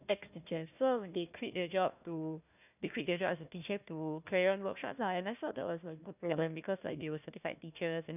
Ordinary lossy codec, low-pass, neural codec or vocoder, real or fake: none; 3.6 kHz; codec, 16 kHz, 1 kbps, FunCodec, trained on Chinese and English, 50 frames a second; fake